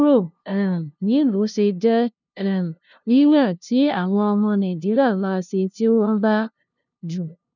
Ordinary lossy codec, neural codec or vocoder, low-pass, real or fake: none; codec, 16 kHz, 0.5 kbps, FunCodec, trained on LibriTTS, 25 frames a second; 7.2 kHz; fake